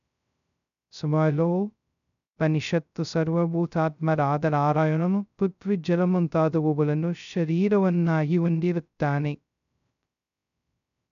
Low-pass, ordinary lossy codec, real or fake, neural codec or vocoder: 7.2 kHz; none; fake; codec, 16 kHz, 0.2 kbps, FocalCodec